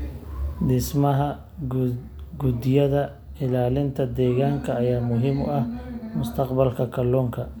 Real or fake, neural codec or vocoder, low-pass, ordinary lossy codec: real; none; none; none